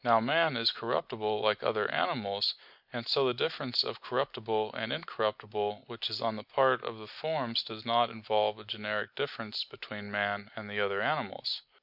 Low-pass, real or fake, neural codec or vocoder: 5.4 kHz; real; none